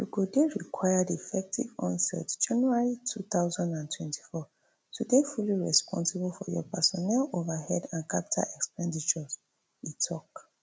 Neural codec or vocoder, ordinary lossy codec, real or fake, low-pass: none; none; real; none